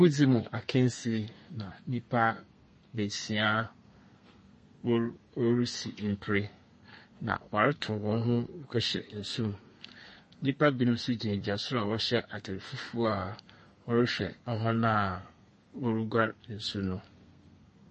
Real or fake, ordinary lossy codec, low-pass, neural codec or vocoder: fake; MP3, 32 kbps; 10.8 kHz; codec, 32 kHz, 1.9 kbps, SNAC